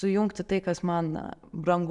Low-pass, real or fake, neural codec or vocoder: 10.8 kHz; real; none